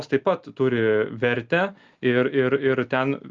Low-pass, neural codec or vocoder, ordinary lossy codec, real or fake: 7.2 kHz; none; Opus, 24 kbps; real